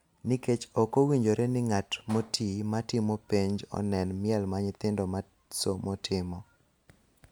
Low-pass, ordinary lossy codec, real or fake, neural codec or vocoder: none; none; real; none